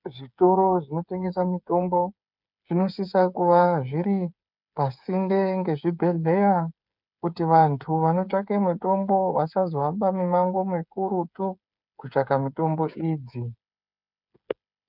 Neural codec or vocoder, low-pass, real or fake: codec, 16 kHz, 8 kbps, FreqCodec, smaller model; 5.4 kHz; fake